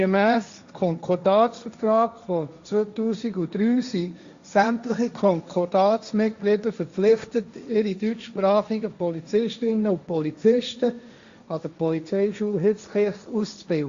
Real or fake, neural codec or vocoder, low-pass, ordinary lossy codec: fake; codec, 16 kHz, 1.1 kbps, Voila-Tokenizer; 7.2 kHz; Opus, 64 kbps